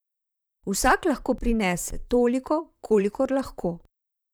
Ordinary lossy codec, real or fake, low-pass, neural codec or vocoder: none; fake; none; vocoder, 44.1 kHz, 128 mel bands, Pupu-Vocoder